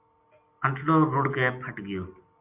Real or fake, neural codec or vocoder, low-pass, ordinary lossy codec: real; none; 3.6 kHz; AAC, 32 kbps